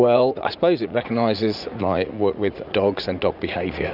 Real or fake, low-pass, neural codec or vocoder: fake; 5.4 kHz; vocoder, 44.1 kHz, 80 mel bands, Vocos